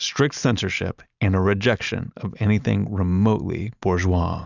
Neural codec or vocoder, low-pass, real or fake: none; 7.2 kHz; real